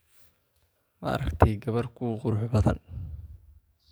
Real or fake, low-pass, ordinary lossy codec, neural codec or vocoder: fake; none; none; codec, 44.1 kHz, 7.8 kbps, DAC